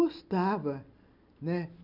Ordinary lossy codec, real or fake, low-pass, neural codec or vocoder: none; real; 5.4 kHz; none